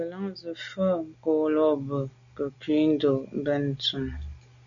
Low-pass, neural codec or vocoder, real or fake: 7.2 kHz; none; real